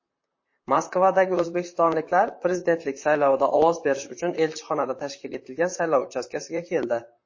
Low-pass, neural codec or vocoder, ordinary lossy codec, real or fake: 7.2 kHz; vocoder, 44.1 kHz, 128 mel bands, Pupu-Vocoder; MP3, 32 kbps; fake